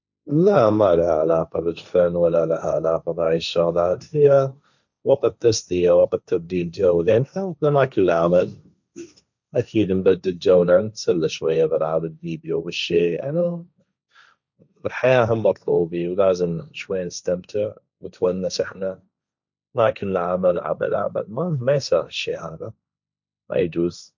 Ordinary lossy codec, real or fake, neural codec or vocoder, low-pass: none; fake; codec, 16 kHz, 1.1 kbps, Voila-Tokenizer; 7.2 kHz